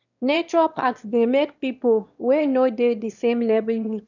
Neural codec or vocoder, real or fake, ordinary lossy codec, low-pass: autoencoder, 22.05 kHz, a latent of 192 numbers a frame, VITS, trained on one speaker; fake; none; 7.2 kHz